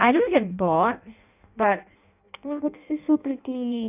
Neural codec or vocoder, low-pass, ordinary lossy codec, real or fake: codec, 16 kHz in and 24 kHz out, 0.6 kbps, FireRedTTS-2 codec; 3.6 kHz; none; fake